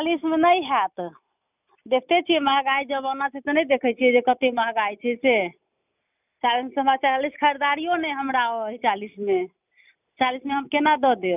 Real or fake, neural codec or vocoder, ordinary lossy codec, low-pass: real; none; none; 3.6 kHz